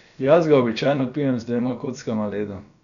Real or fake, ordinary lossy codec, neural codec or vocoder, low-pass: fake; none; codec, 16 kHz, about 1 kbps, DyCAST, with the encoder's durations; 7.2 kHz